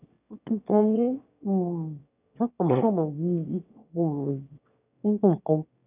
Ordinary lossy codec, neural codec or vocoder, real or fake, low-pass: none; autoencoder, 22.05 kHz, a latent of 192 numbers a frame, VITS, trained on one speaker; fake; 3.6 kHz